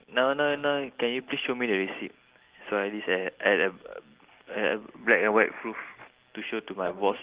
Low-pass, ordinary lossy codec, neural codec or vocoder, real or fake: 3.6 kHz; Opus, 16 kbps; none; real